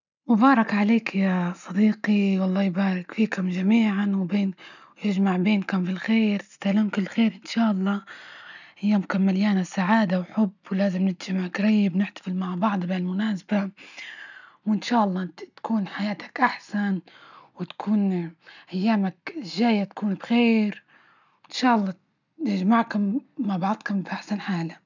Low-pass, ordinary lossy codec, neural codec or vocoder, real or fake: 7.2 kHz; none; none; real